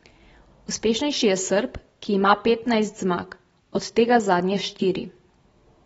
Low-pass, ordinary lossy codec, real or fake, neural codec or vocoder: 19.8 kHz; AAC, 24 kbps; real; none